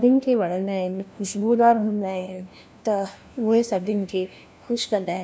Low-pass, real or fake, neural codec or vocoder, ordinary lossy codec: none; fake; codec, 16 kHz, 1 kbps, FunCodec, trained on LibriTTS, 50 frames a second; none